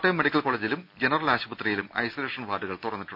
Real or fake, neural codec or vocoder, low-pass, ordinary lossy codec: real; none; 5.4 kHz; none